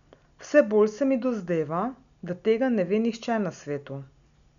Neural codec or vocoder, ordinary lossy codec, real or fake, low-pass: none; none; real; 7.2 kHz